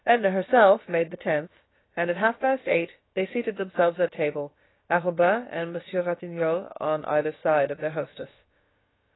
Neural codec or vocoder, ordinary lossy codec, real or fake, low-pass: codec, 16 kHz, about 1 kbps, DyCAST, with the encoder's durations; AAC, 16 kbps; fake; 7.2 kHz